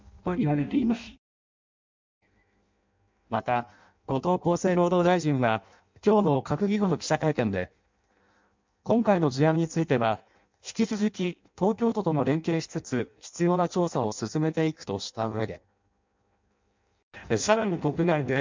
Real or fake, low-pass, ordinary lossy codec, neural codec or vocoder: fake; 7.2 kHz; MP3, 64 kbps; codec, 16 kHz in and 24 kHz out, 0.6 kbps, FireRedTTS-2 codec